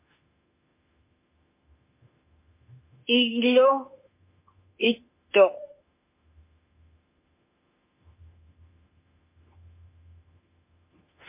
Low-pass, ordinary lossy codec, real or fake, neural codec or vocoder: 3.6 kHz; MP3, 24 kbps; fake; autoencoder, 48 kHz, 32 numbers a frame, DAC-VAE, trained on Japanese speech